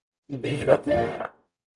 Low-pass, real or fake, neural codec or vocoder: 10.8 kHz; fake; codec, 44.1 kHz, 0.9 kbps, DAC